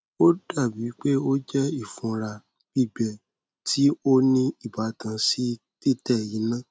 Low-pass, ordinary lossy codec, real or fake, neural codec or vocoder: none; none; real; none